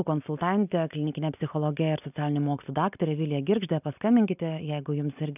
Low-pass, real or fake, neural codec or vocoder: 3.6 kHz; real; none